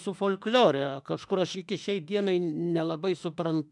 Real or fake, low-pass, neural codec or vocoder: fake; 10.8 kHz; autoencoder, 48 kHz, 32 numbers a frame, DAC-VAE, trained on Japanese speech